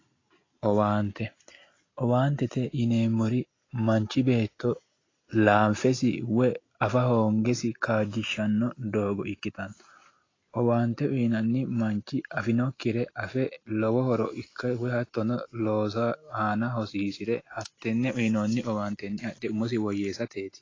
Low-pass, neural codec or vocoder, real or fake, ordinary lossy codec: 7.2 kHz; none; real; AAC, 32 kbps